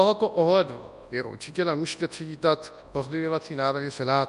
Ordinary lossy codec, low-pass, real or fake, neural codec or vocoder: MP3, 64 kbps; 10.8 kHz; fake; codec, 24 kHz, 0.9 kbps, WavTokenizer, large speech release